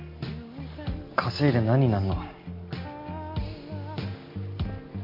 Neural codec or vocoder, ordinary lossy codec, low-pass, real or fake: none; MP3, 32 kbps; 5.4 kHz; real